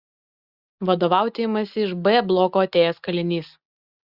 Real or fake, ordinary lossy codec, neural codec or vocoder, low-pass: real; Opus, 64 kbps; none; 5.4 kHz